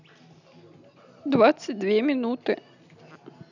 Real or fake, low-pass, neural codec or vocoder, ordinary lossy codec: real; 7.2 kHz; none; none